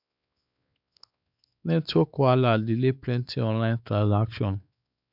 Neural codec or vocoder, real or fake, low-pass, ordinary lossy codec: codec, 16 kHz, 4 kbps, X-Codec, WavLM features, trained on Multilingual LibriSpeech; fake; 5.4 kHz; none